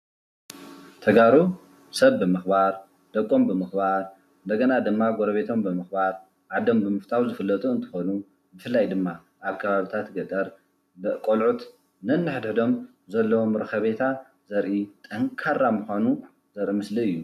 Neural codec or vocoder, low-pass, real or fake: none; 14.4 kHz; real